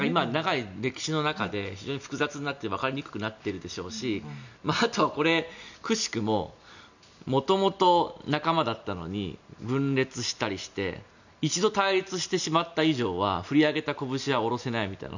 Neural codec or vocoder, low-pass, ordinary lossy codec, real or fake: none; 7.2 kHz; none; real